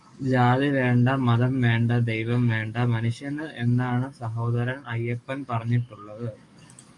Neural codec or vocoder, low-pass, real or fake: codec, 44.1 kHz, 7.8 kbps, DAC; 10.8 kHz; fake